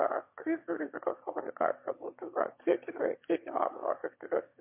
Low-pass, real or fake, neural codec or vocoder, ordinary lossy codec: 3.6 kHz; fake; autoencoder, 22.05 kHz, a latent of 192 numbers a frame, VITS, trained on one speaker; MP3, 24 kbps